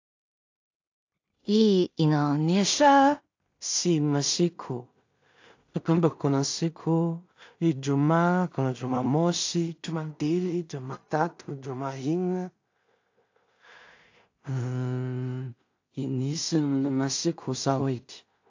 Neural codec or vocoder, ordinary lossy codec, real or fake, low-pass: codec, 16 kHz in and 24 kHz out, 0.4 kbps, LongCat-Audio-Codec, two codebook decoder; AAC, 48 kbps; fake; 7.2 kHz